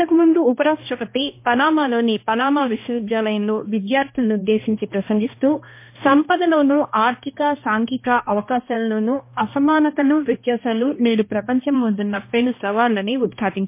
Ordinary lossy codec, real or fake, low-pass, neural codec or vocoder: MP3, 24 kbps; fake; 3.6 kHz; codec, 16 kHz, 1 kbps, X-Codec, HuBERT features, trained on balanced general audio